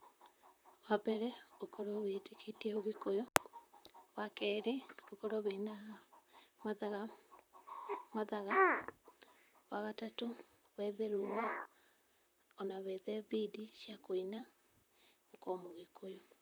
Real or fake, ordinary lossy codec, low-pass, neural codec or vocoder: fake; none; none; vocoder, 44.1 kHz, 128 mel bands, Pupu-Vocoder